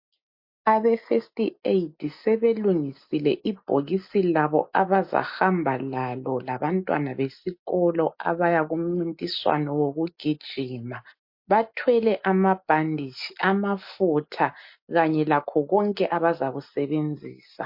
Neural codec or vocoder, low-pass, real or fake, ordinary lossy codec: none; 5.4 kHz; real; MP3, 32 kbps